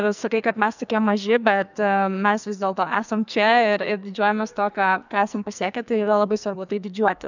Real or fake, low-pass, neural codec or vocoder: fake; 7.2 kHz; codec, 44.1 kHz, 2.6 kbps, SNAC